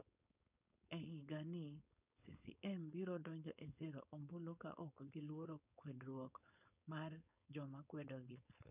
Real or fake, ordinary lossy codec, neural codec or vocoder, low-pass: fake; none; codec, 16 kHz, 4.8 kbps, FACodec; 3.6 kHz